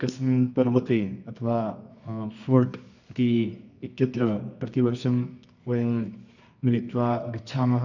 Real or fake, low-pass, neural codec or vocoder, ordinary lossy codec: fake; 7.2 kHz; codec, 24 kHz, 0.9 kbps, WavTokenizer, medium music audio release; none